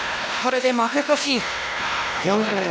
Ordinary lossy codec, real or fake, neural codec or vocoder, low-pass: none; fake; codec, 16 kHz, 1 kbps, X-Codec, WavLM features, trained on Multilingual LibriSpeech; none